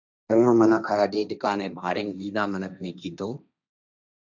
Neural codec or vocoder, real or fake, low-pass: codec, 16 kHz, 1.1 kbps, Voila-Tokenizer; fake; 7.2 kHz